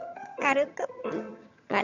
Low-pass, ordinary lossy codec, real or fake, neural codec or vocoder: 7.2 kHz; none; fake; vocoder, 22.05 kHz, 80 mel bands, HiFi-GAN